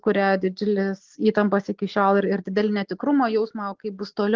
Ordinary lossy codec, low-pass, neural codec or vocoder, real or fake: Opus, 16 kbps; 7.2 kHz; none; real